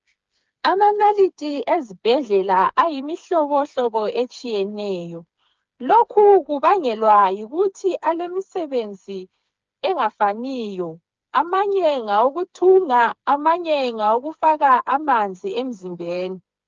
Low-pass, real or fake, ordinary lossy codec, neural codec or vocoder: 7.2 kHz; fake; Opus, 24 kbps; codec, 16 kHz, 4 kbps, FreqCodec, smaller model